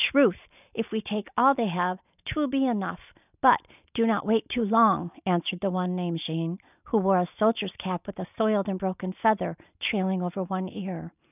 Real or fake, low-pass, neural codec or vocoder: real; 3.6 kHz; none